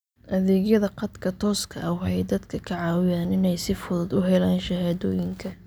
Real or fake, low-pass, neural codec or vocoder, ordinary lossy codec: real; none; none; none